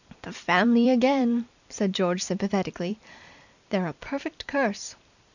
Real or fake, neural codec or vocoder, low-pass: fake; vocoder, 44.1 kHz, 128 mel bands every 256 samples, BigVGAN v2; 7.2 kHz